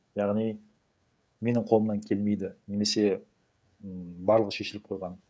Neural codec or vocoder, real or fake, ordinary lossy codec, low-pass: codec, 16 kHz, 16 kbps, FreqCodec, smaller model; fake; none; none